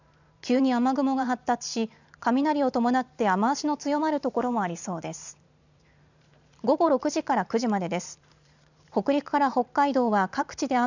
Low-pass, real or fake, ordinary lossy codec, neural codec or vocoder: 7.2 kHz; fake; none; vocoder, 44.1 kHz, 128 mel bands every 512 samples, BigVGAN v2